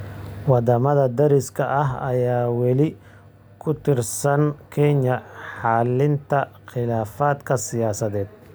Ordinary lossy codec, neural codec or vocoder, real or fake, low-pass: none; none; real; none